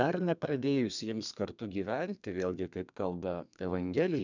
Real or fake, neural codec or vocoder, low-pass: fake; codec, 32 kHz, 1.9 kbps, SNAC; 7.2 kHz